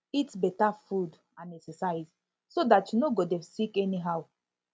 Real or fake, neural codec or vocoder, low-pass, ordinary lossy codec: real; none; none; none